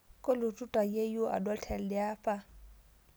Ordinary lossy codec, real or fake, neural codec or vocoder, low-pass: none; real; none; none